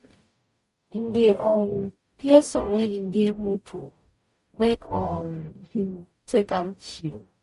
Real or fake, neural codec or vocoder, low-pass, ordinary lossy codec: fake; codec, 44.1 kHz, 0.9 kbps, DAC; 14.4 kHz; MP3, 48 kbps